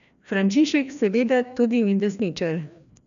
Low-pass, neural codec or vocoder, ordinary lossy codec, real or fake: 7.2 kHz; codec, 16 kHz, 1 kbps, FreqCodec, larger model; none; fake